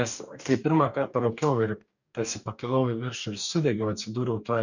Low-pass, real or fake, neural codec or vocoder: 7.2 kHz; fake; codec, 44.1 kHz, 2.6 kbps, DAC